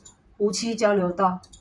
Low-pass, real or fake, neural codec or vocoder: 10.8 kHz; fake; vocoder, 44.1 kHz, 128 mel bands, Pupu-Vocoder